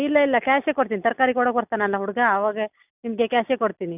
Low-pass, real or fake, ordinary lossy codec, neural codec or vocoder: 3.6 kHz; real; none; none